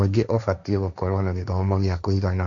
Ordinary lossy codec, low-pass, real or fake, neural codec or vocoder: Opus, 64 kbps; 7.2 kHz; fake; codec, 16 kHz, 1.1 kbps, Voila-Tokenizer